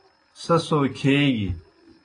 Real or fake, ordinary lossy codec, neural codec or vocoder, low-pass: real; AAC, 32 kbps; none; 9.9 kHz